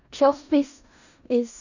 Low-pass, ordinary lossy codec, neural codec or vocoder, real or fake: 7.2 kHz; none; codec, 16 kHz in and 24 kHz out, 0.4 kbps, LongCat-Audio-Codec, four codebook decoder; fake